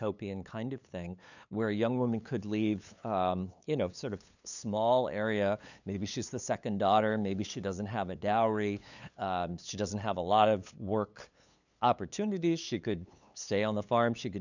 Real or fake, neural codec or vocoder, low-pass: fake; codec, 16 kHz, 8 kbps, FunCodec, trained on LibriTTS, 25 frames a second; 7.2 kHz